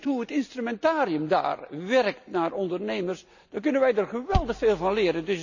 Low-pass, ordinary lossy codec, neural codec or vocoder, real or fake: 7.2 kHz; none; none; real